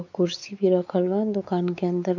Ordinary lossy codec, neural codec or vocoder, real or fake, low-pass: none; codec, 16 kHz, 4 kbps, X-Codec, WavLM features, trained on Multilingual LibriSpeech; fake; 7.2 kHz